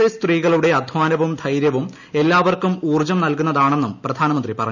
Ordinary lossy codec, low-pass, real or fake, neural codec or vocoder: none; 7.2 kHz; real; none